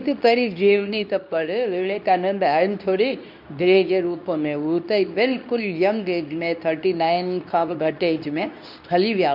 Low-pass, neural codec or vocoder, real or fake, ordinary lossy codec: 5.4 kHz; codec, 24 kHz, 0.9 kbps, WavTokenizer, medium speech release version 2; fake; none